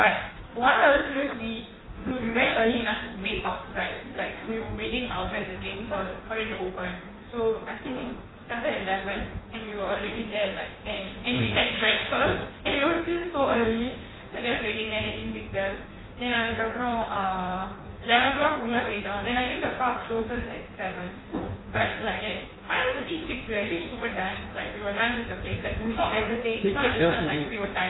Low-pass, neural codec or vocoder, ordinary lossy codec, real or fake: 7.2 kHz; codec, 16 kHz in and 24 kHz out, 1.1 kbps, FireRedTTS-2 codec; AAC, 16 kbps; fake